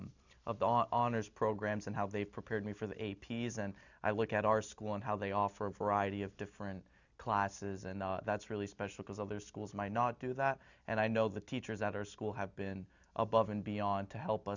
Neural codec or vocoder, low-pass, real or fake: none; 7.2 kHz; real